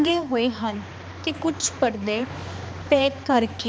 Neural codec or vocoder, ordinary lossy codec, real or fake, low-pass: codec, 16 kHz, 2 kbps, X-Codec, HuBERT features, trained on balanced general audio; none; fake; none